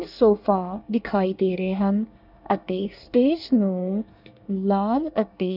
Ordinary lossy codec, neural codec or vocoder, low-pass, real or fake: none; codec, 24 kHz, 1 kbps, SNAC; 5.4 kHz; fake